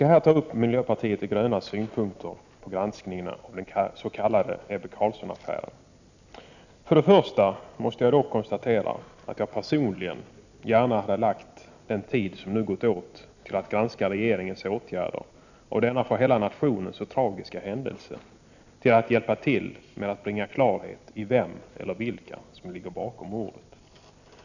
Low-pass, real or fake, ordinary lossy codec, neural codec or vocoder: 7.2 kHz; real; none; none